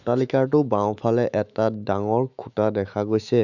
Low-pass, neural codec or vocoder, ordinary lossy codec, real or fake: 7.2 kHz; autoencoder, 48 kHz, 128 numbers a frame, DAC-VAE, trained on Japanese speech; none; fake